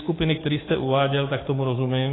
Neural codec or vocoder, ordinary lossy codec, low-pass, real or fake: codec, 44.1 kHz, 7.8 kbps, DAC; AAC, 16 kbps; 7.2 kHz; fake